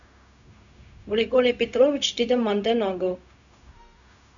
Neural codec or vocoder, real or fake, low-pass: codec, 16 kHz, 0.4 kbps, LongCat-Audio-Codec; fake; 7.2 kHz